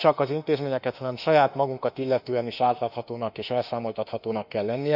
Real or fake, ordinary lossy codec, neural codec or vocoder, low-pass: fake; none; autoencoder, 48 kHz, 32 numbers a frame, DAC-VAE, trained on Japanese speech; 5.4 kHz